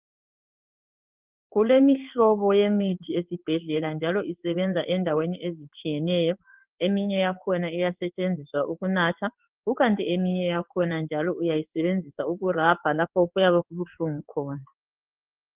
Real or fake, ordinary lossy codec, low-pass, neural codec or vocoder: fake; Opus, 32 kbps; 3.6 kHz; codec, 16 kHz in and 24 kHz out, 1 kbps, XY-Tokenizer